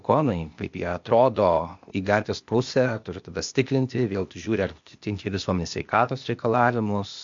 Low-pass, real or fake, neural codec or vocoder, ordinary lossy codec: 7.2 kHz; fake; codec, 16 kHz, 0.8 kbps, ZipCodec; MP3, 48 kbps